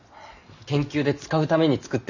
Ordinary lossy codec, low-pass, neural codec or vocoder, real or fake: none; 7.2 kHz; none; real